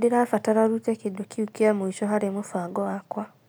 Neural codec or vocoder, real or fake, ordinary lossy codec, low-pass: none; real; none; none